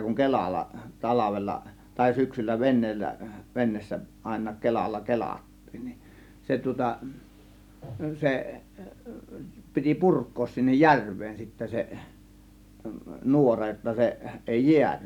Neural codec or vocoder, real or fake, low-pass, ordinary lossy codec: none; real; 19.8 kHz; none